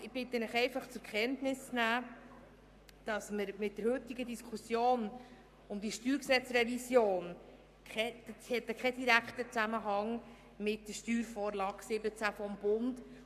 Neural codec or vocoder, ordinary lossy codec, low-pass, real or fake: codec, 44.1 kHz, 7.8 kbps, Pupu-Codec; AAC, 96 kbps; 14.4 kHz; fake